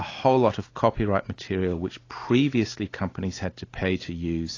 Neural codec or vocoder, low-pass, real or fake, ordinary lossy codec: none; 7.2 kHz; real; AAC, 32 kbps